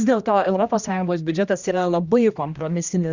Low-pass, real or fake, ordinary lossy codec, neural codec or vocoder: 7.2 kHz; fake; Opus, 64 kbps; codec, 16 kHz, 1 kbps, X-Codec, HuBERT features, trained on general audio